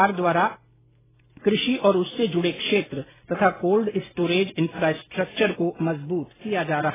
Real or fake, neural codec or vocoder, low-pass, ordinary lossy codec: real; none; 3.6 kHz; AAC, 16 kbps